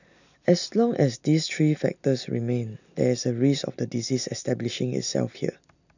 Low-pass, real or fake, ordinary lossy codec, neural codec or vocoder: 7.2 kHz; real; none; none